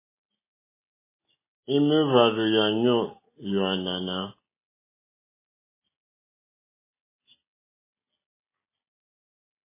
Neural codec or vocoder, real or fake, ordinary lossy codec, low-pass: none; real; MP3, 16 kbps; 3.6 kHz